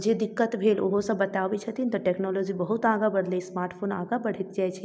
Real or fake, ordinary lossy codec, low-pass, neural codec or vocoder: real; none; none; none